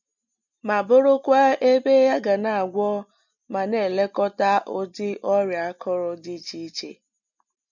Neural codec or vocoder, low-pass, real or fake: none; 7.2 kHz; real